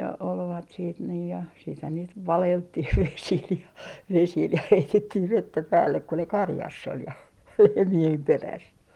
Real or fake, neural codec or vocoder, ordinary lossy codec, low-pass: real; none; Opus, 24 kbps; 19.8 kHz